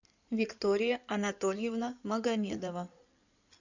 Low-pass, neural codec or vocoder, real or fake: 7.2 kHz; codec, 16 kHz in and 24 kHz out, 2.2 kbps, FireRedTTS-2 codec; fake